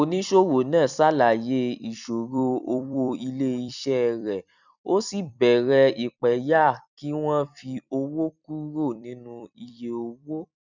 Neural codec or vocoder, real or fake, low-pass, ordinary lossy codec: vocoder, 44.1 kHz, 128 mel bands every 256 samples, BigVGAN v2; fake; 7.2 kHz; none